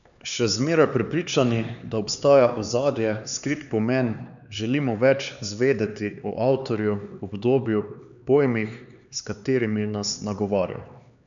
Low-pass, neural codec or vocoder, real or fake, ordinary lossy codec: 7.2 kHz; codec, 16 kHz, 4 kbps, X-Codec, HuBERT features, trained on LibriSpeech; fake; none